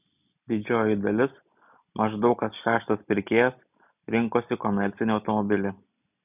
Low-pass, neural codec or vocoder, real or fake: 3.6 kHz; none; real